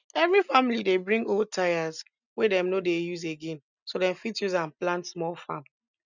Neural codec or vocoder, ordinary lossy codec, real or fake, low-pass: none; none; real; 7.2 kHz